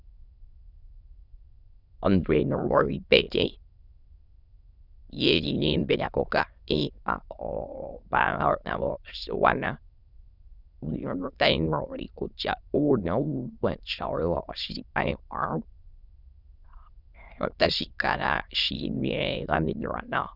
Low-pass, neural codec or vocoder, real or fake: 5.4 kHz; autoencoder, 22.05 kHz, a latent of 192 numbers a frame, VITS, trained on many speakers; fake